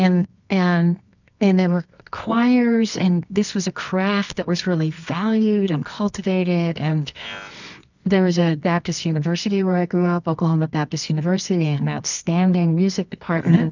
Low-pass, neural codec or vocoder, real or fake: 7.2 kHz; codec, 24 kHz, 0.9 kbps, WavTokenizer, medium music audio release; fake